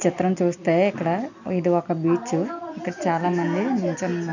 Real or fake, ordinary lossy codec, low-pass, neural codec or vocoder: real; none; 7.2 kHz; none